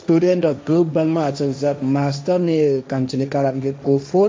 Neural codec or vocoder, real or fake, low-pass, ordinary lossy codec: codec, 16 kHz, 1.1 kbps, Voila-Tokenizer; fake; none; none